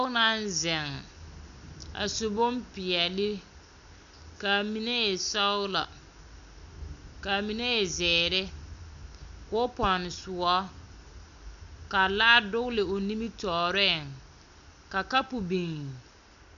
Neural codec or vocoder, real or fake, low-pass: none; real; 7.2 kHz